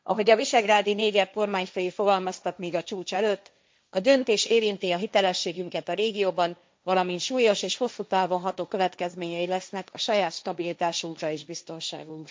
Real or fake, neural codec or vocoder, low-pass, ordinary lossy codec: fake; codec, 16 kHz, 1.1 kbps, Voila-Tokenizer; none; none